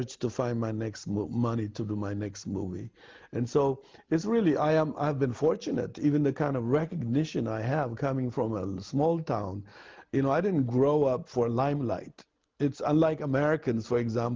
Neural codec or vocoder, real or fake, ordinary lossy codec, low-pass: none; real; Opus, 32 kbps; 7.2 kHz